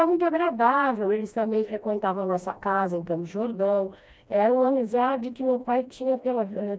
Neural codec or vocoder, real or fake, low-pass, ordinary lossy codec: codec, 16 kHz, 1 kbps, FreqCodec, smaller model; fake; none; none